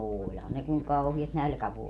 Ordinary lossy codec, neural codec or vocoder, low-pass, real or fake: none; none; none; real